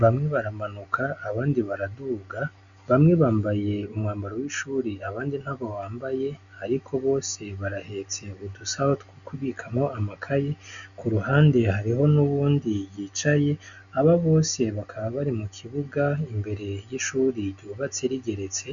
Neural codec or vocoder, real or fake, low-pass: none; real; 7.2 kHz